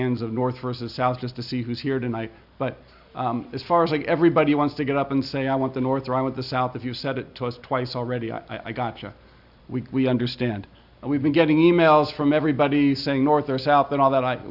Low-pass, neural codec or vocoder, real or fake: 5.4 kHz; none; real